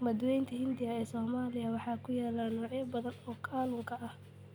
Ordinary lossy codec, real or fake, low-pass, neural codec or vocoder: none; real; none; none